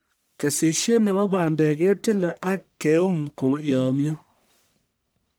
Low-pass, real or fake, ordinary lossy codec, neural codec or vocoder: none; fake; none; codec, 44.1 kHz, 1.7 kbps, Pupu-Codec